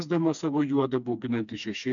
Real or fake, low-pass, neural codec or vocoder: fake; 7.2 kHz; codec, 16 kHz, 2 kbps, FreqCodec, smaller model